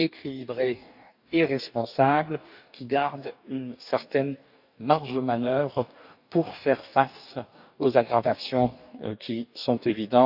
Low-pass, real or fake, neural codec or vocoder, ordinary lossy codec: 5.4 kHz; fake; codec, 44.1 kHz, 2.6 kbps, DAC; none